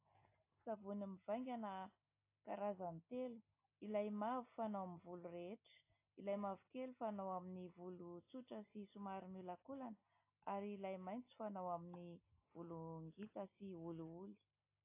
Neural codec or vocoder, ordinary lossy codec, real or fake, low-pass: none; AAC, 24 kbps; real; 3.6 kHz